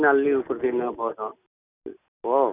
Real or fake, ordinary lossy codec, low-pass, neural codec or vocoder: real; none; 3.6 kHz; none